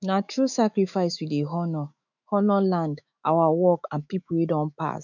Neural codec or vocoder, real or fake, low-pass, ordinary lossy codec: autoencoder, 48 kHz, 128 numbers a frame, DAC-VAE, trained on Japanese speech; fake; 7.2 kHz; none